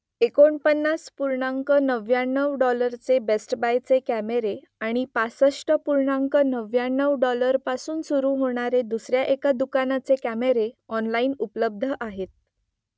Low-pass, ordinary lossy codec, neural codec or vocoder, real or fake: none; none; none; real